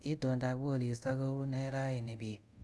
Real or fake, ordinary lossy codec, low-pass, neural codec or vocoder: fake; none; none; codec, 24 kHz, 0.5 kbps, DualCodec